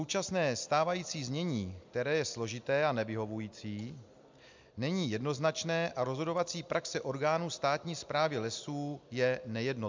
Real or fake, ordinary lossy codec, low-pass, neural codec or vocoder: real; MP3, 64 kbps; 7.2 kHz; none